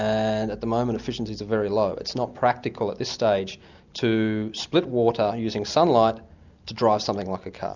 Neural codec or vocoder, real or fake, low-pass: none; real; 7.2 kHz